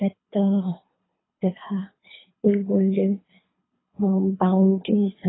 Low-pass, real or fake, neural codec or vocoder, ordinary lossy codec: 7.2 kHz; fake; codec, 24 kHz, 3 kbps, HILCodec; AAC, 16 kbps